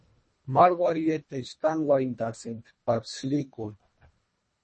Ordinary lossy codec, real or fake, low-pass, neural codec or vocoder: MP3, 32 kbps; fake; 10.8 kHz; codec, 24 kHz, 1.5 kbps, HILCodec